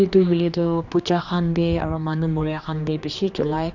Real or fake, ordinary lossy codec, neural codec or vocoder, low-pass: fake; none; codec, 16 kHz, 2 kbps, X-Codec, HuBERT features, trained on general audio; 7.2 kHz